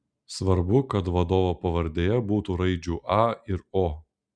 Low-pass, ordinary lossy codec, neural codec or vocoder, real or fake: 9.9 kHz; Opus, 64 kbps; none; real